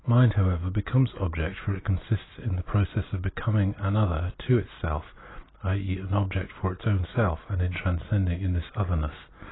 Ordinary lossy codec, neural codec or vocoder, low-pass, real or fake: AAC, 16 kbps; none; 7.2 kHz; real